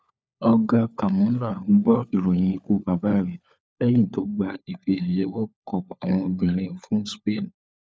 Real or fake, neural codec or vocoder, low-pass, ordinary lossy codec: fake; codec, 16 kHz, 4 kbps, FunCodec, trained on LibriTTS, 50 frames a second; none; none